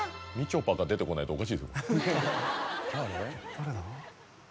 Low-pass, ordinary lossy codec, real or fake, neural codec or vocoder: none; none; real; none